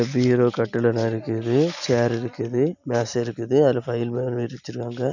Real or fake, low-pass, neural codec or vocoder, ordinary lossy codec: real; 7.2 kHz; none; none